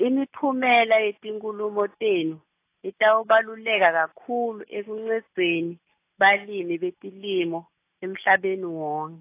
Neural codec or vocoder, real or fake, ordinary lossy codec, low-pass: none; real; AAC, 24 kbps; 3.6 kHz